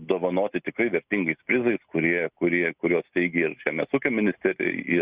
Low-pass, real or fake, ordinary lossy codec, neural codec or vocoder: 3.6 kHz; real; Opus, 64 kbps; none